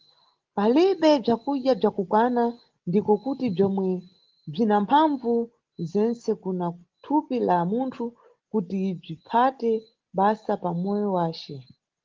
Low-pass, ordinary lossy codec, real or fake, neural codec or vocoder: 7.2 kHz; Opus, 16 kbps; real; none